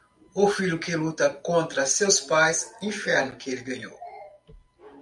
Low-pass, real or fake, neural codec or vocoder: 10.8 kHz; real; none